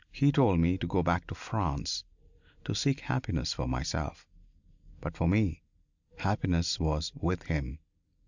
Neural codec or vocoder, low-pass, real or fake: none; 7.2 kHz; real